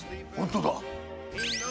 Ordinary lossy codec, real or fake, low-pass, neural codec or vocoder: none; real; none; none